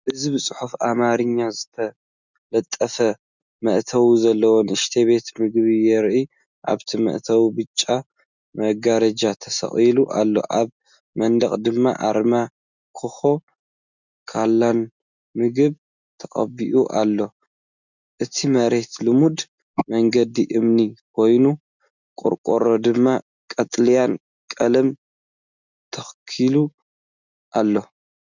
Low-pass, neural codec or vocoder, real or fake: 7.2 kHz; none; real